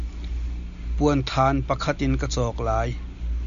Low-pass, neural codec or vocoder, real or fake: 7.2 kHz; none; real